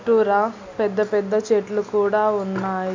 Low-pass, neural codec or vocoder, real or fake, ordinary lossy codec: 7.2 kHz; none; real; MP3, 64 kbps